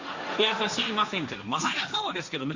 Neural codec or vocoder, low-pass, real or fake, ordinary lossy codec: codec, 16 kHz, 1.1 kbps, Voila-Tokenizer; 7.2 kHz; fake; Opus, 64 kbps